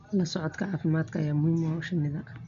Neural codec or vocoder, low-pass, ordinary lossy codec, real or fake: none; 7.2 kHz; none; real